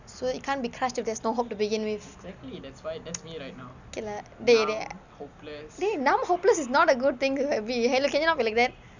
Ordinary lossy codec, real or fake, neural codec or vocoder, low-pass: none; real; none; 7.2 kHz